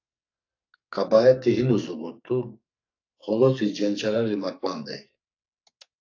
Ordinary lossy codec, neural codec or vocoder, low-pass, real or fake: AAC, 48 kbps; codec, 44.1 kHz, 2.6 kbps, SNAC; 7.2 kHz; fake